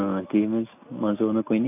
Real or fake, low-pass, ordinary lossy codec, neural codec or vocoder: real; 3.6 kHz; none; none